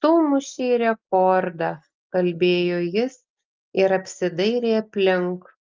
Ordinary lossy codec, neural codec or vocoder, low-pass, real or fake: Opus, 32 kbps; none; 7.2 kHz; real